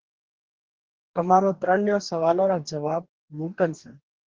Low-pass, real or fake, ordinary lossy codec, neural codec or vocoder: 7.2 kHz; fake; Opus, 16 kbps; codec, 44.1 kHz, 2.6 kbps, DAC